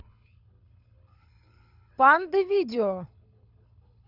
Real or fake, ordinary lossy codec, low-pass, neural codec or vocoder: fake; none; 5.4 kHz; codec, 24 kHz, 6 kbps, HILCodec